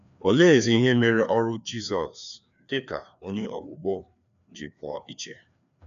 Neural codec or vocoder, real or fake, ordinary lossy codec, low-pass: codec, 16 kHz, 2 kbps, FreqCodec, larger model; fake; none; 7.2 kHz